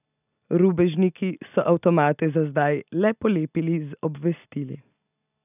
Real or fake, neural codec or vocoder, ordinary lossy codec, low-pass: real; none; none; 3.6 kHz